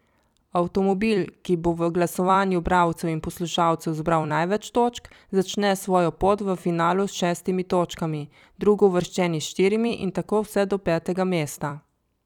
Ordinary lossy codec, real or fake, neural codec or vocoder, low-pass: none; fake; vocoder, 44.1 kHz, 128 mel bands every 256 samples, BigVGAN v2; 19.8 kHz